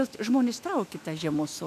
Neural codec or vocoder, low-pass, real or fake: autoencoder, 48 kHz, 128 numbers a frame, DAC-VAE, trained on Japanese speech; 14.4 kHz; fake